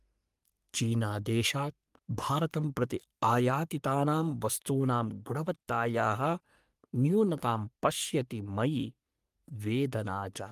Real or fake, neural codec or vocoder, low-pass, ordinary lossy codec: fake; codec, 44.1 kHz, 3.4 kbps, Pupu-Codec; 14.4 kHz; Opus, 32 kbps